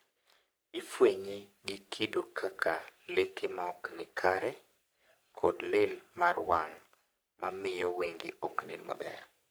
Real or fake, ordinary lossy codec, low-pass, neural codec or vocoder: fake; none; none; codec, 44.1 kHz, 3.4 kbps, Pupu-Codec